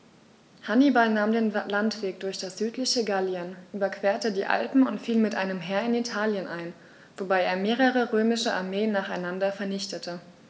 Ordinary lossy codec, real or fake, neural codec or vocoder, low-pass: none; real; none; none